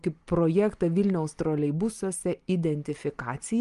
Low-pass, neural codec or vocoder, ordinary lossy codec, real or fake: 10.8 kHz; none; Opus, 32 kbps; real